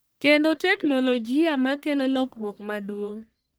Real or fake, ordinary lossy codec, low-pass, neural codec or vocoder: fake; none; none; codec, 44.1 kHz, 1.7 kbps, Pupu-Codec